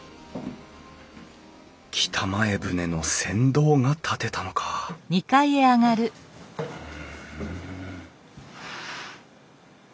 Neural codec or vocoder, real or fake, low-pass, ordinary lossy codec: none; real; none; none